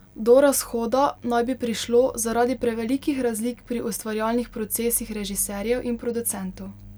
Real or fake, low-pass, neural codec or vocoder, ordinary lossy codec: real; none; none; none